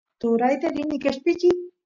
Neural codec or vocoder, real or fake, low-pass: none; real; 7.2 kHz